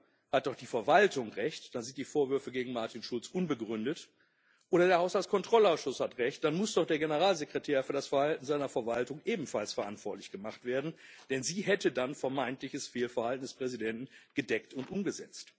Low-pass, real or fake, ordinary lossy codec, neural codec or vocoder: none; real; none; none